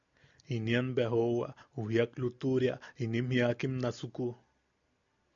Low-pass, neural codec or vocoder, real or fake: 7.2 kHz; none; real